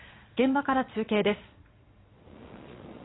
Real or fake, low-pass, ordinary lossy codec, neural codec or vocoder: fake; 7.2 kHz; AAC, 16 kbps; vocoder, 22.05 kHz, 80 mel bands, Vocos